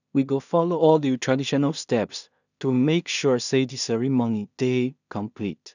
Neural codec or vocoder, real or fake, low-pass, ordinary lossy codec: codec, 16 kHz in and 24 kHz out, 0.4 kbps, LongCat-Audio-Codec, two codebook decoder; fake; 7.2 kHz; none